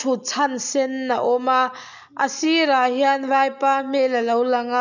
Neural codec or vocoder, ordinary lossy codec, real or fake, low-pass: none; none; real; 7.2 kHz